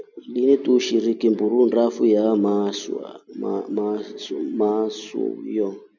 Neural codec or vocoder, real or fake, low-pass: none; real; 7.2 kHz